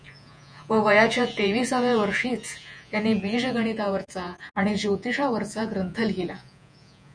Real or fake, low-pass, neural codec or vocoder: fake; 9.9 kHz; vocoder, 48 kHz, 128 mel bands, Vocos